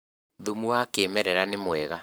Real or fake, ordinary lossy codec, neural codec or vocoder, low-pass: fake; none; codec, 44.1 kHz, 7.8 kbps, Pupu-Codec; none